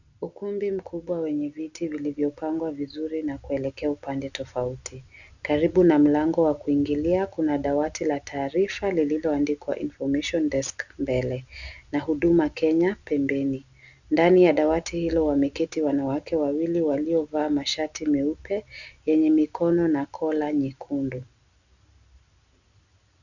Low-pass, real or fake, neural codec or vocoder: 7.2 kHz; real; none